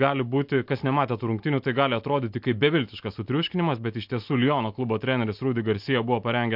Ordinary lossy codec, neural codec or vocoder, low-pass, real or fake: MP3, 48 kbps; none; 5.4 kHz; real